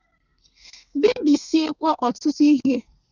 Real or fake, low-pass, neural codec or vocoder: fake; 7.2 kHz; codec, 32 kHz, 1.9 kbps, SNAC